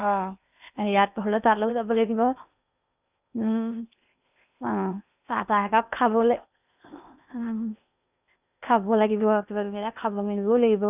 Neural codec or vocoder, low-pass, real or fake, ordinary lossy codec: codec, 16 kHz in and 24 kHz out, 0.8 kbps, FocalCodec, streaming, 65536 codes; 3.6 kHz; fake; none